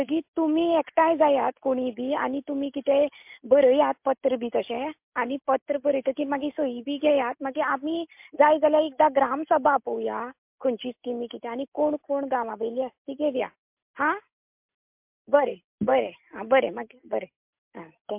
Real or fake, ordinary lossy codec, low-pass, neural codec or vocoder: real; MP3, 32 kbps; 3.6 kHz; none